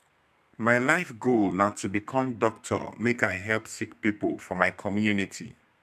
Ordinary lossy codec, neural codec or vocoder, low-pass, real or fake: none; codec, 32 kHz, 1.9 kbps, SNAC; 14.4 kHz; fake